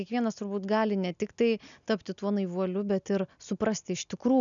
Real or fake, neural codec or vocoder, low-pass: real; none; 7.2 kHz